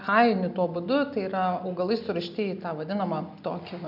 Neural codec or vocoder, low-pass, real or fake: none; 5.4 kHz; real